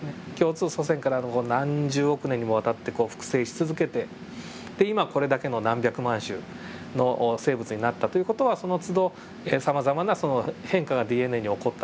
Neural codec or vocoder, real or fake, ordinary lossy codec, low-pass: none; real; none; none